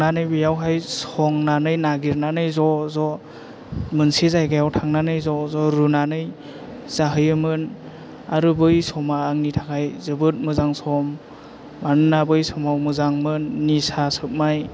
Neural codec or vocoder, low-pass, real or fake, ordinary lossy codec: none; none; real; none